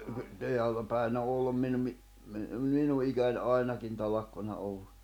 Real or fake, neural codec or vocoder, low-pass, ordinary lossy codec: real; none; 19.8 kHz; none